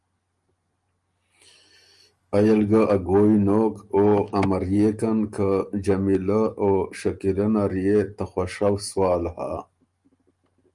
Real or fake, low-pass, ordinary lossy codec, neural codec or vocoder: real; 10.8 kHz; Opus, 32 kbps; none